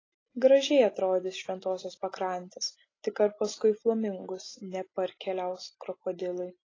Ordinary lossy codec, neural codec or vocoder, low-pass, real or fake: AAC, 32 kbps; none; 7.2 kHz; real